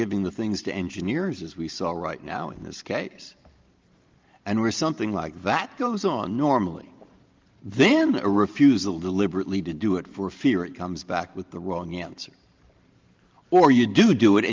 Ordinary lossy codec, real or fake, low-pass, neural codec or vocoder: Opus, 24 kbps; fake; 7.2 kHz; vocoder, 44.1 kHz, 128 mel bands every 512 samples, BigVGAN v2